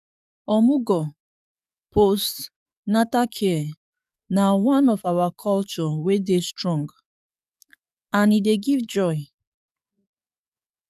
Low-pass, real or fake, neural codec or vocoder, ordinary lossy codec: 14.4 kHz; fake; codec, 44.1 kHz, 7.8 kbps, DAC; none